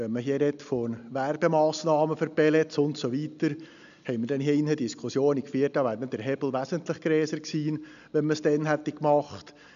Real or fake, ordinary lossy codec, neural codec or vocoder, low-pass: real; none; none; 7.2 kHz